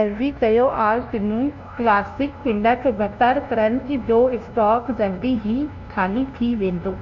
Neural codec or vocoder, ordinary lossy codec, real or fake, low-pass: codec, 16 kHz, 0.5 kbps, FunCodec, trained on LibriTTS, 25 frames a second; none; fake; 7.2 kHz